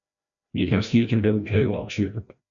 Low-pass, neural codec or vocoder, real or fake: 7.2 kHz; codec, 16 kHz, 1 kbps, FreqCodec, larger model; fake